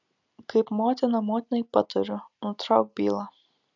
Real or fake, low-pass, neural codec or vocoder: real; 7.2 kHz; none